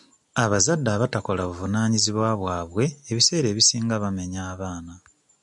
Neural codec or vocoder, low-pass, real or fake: none; 10.8 kHz; real